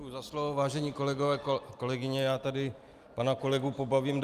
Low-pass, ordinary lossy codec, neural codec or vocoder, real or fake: 14.4 kHz; Opus, 32 kbps; none; real